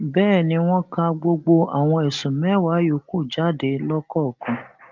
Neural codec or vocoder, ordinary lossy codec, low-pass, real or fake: none; Opus, 32 kbps; 7.2 kHz; real